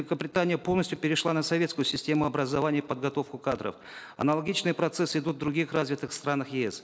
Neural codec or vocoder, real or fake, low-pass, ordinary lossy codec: none; real; none; none